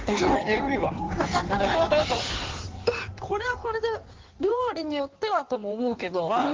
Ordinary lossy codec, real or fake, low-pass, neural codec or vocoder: Opus, 16 kbps; fake; 7.2 kHz; codec, 16 kHz in and 24 kHz out, 1.1 kbps, FireRedTTS-2 codec